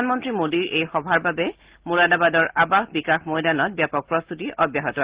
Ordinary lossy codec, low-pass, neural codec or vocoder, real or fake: Opus, 16 kbps; 3.6 kHz; none; real